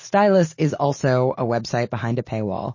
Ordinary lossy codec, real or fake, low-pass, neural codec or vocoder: MP3, 32 kbps; real; 7.2 kHz; none